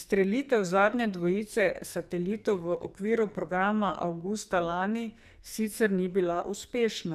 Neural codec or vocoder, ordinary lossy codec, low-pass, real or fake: codec, 44.1 kHz, 2.6 kbps, SNAC; none; 14.4 kHz; fake